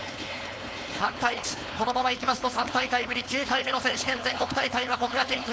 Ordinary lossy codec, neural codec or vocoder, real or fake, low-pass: none; codec, 16 kHz, 4.8 kbps, FACodec; fake; none